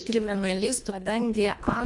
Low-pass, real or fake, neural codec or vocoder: 10.8 kHz; fake; codec, 24 kHz, 1.5 kbps, HILCodec